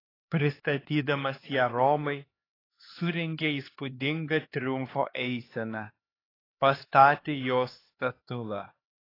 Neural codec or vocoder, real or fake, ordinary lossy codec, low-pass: codec, 16 kHz, 2 kbps, X-Codec, HuBERT features, trained on LibriSpeech; fake; AAC, 24 kbps; 5.4 kHz